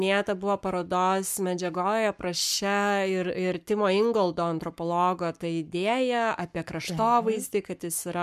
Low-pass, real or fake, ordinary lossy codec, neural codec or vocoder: 14.4 kHz; fake; MP3, 96 kbps; codec, 44.1 kHz, 7.8 kbps, Pupu-Codec